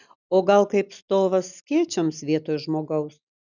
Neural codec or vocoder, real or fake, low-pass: none; real; 7.2 kHz